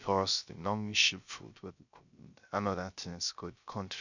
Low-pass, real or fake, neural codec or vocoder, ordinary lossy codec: 7.2 kHz; fake; codec, 16 kHz, 0.3 kbps, FocalCodec; none